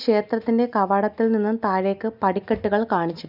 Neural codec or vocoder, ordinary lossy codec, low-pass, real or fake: none; none; 5.4 kHz; real